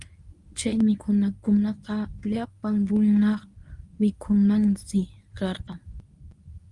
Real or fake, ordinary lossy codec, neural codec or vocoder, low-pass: fake; Opus, 32 kbps; codec, 24 kHz, 0.9 kbps, WavTokenizer, medium speech release version 1; 10.8 kHz